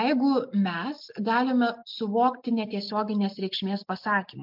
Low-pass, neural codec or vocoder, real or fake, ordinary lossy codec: 5.4 kHz; none; real; MP3, 48 kbps